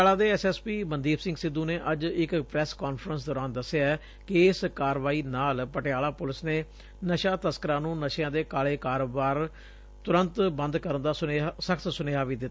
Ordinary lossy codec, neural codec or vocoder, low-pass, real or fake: none; none; none; real